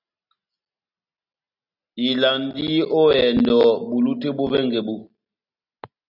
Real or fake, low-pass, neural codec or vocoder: real; 5.4 kHz; none